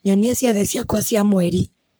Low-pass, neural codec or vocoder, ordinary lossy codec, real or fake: none; codec, 44.1 kHz, 3.4 kbps, Pupu-Codec; none; fake